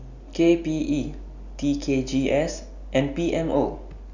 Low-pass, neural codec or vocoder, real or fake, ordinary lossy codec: 7.2 kHz; none; real; none